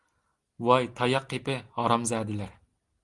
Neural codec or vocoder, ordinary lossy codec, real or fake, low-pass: none; Opus, 24 kbps; real; 10.8 kHz